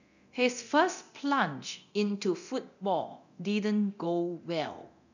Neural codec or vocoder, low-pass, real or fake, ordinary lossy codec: codec, 24 kHz, 0.9 kbps, DualCodec; 7.2 kHz; fake; none